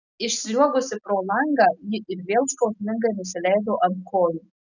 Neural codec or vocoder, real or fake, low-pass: none; real; 7.2 kHz